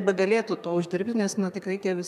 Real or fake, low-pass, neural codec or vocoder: fake; 14.4 kHz; codec, 32 kHz, 1.9 kbps, SNAC